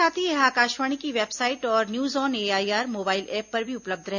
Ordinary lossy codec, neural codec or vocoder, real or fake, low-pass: none; none; real; 7.2 kHz